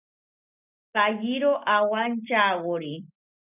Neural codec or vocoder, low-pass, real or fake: none; 3.6 kHz; real